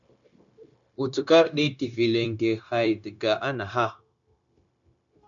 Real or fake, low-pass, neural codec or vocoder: fake; 7.2 kHz; codec, 16 kHz, 0.9 kbps, LongCat-Audio-Codec